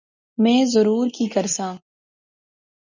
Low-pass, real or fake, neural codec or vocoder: 7.2 kHz; real; none